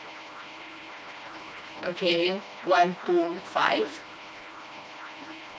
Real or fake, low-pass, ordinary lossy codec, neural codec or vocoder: fake; none; none; codec, 16 kHz, 1 kbps, FreqCodec, smaller model